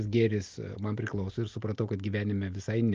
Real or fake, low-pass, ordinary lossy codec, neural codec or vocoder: real; 7.2 kHz; Opus, 24 kbps; none